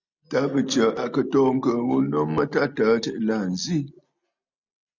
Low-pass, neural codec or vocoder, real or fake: 7.2 kHz; none; real